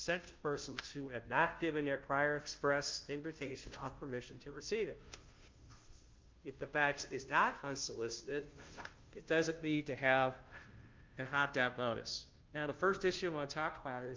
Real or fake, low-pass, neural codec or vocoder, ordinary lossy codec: fake; 7.2 kHz; codec, 16 kHz, 0.5 kbps, FunCodec, trained on Chinese and English, 25 frames a second; Opus, 24 kbps